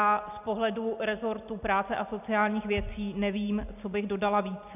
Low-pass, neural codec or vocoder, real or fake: 3.6 kHz; none; real